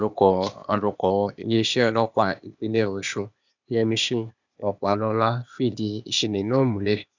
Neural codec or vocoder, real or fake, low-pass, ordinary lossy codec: codec, 16 kHz, 0.8 kbps, ZipCodec; fake; 7.2 kHz; none